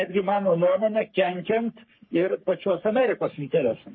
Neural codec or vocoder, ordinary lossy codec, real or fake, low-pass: codec, 44.1 kHz, 3.4 kbps, Pupu-Codec; MP3, 24 kbps; fake; 7.2 kHz